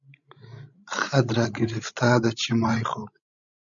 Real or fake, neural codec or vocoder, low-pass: fake; codec, 16 kHz, 16 kbps, FreqCodec, larger model; 7.2 kHz